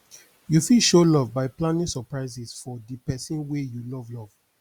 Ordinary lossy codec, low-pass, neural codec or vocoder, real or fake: Opus, 64 kbps; 19.8 kHz; none; real